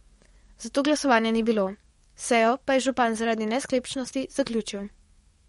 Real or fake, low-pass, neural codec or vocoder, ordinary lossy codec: fake; 19.8 kHz; codec, 44.1 kHz, 7.8 kbps, DAC; MP3, 48 kbps